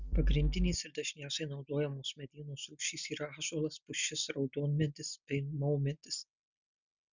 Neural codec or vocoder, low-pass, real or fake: none; 7.2 kHz; real